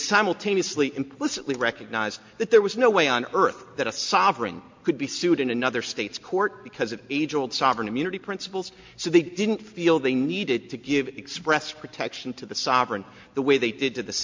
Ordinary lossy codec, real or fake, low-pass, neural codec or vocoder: MP3, 48 kbps; real; 7.2 kHz; none